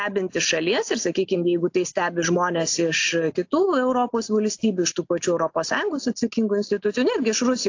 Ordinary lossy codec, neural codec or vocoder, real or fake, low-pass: AAC, 48 kbps; none; real; 7.2 kHz